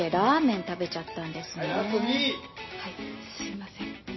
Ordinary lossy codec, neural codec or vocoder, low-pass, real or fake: MP3, 24 kbps; none; 7.2 kHz; real